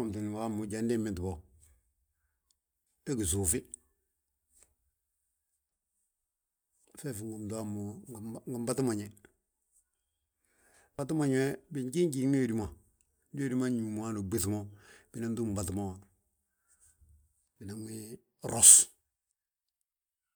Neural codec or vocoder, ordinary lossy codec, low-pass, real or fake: none; none; none; real